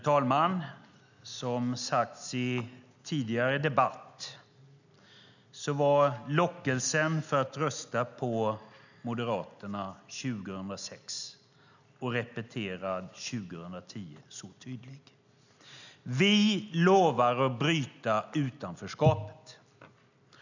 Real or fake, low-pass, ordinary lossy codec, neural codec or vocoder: real; 7.2 kHz; none; none